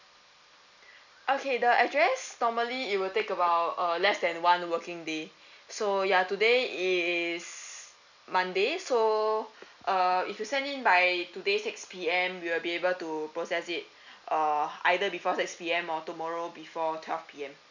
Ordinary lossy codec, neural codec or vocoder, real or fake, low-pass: none; none; real; 7.2 kHz